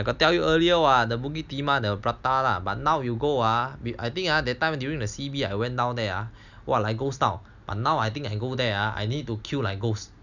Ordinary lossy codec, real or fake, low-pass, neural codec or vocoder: none; real; 7.2 kHz; none